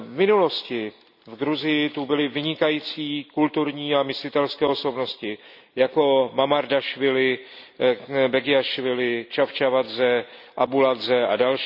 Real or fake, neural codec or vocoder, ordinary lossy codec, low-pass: real; none; none; 5.4 kHz